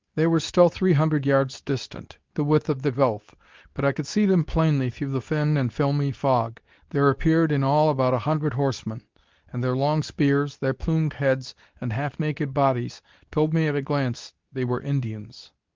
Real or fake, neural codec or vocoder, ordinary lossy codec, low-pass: fake; codec, 24 kHz, 0.9 kbps, WavTokenizer, medium speech release version 2; Opus, 32 kbps; 7.2 kHz